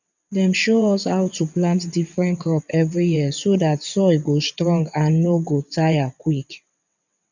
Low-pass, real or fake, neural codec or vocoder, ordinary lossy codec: 7.2 kHz; fake; vocoder, 22.05 kHz, 80 mel bands, WaveNeXt; none